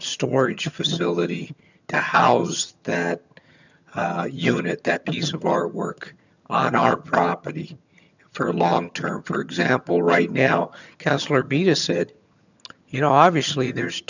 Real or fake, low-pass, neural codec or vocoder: fake; 7.2 kHz; vocoder, 22.05 kHz, 80 mel bands, HiFi-GAN